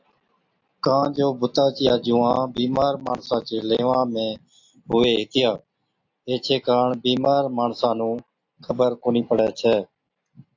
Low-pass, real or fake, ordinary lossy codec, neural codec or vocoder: 7.2 kHz; real; AAC, 48 kbps; none